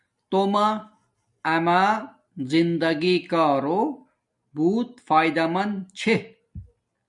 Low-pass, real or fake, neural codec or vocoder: 10.8 kHz; real; none